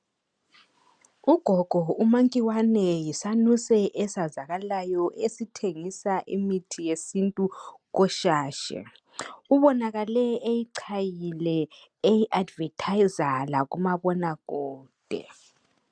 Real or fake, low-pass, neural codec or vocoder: real; 9.9 kHz; none